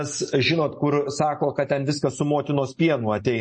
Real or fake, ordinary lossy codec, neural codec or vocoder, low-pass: real; MP3, 32 kbps; none; 10.8 kHz